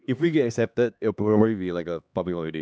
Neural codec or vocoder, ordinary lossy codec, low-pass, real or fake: codec, 16 kHz, 2 kbps, X-Codec, HuBERT features, trained on LibriSpeech; none; none; fake